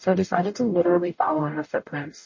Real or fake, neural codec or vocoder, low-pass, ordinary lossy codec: fake; codec, 44.1 kHz, 0.9 kbps, DAC; 7.2 kHz; MP3, 32 kbps